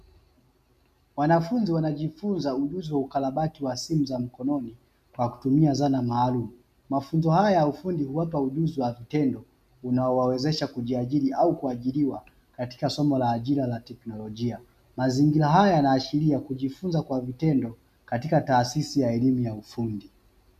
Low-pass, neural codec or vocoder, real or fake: 14.4 kHz; none; real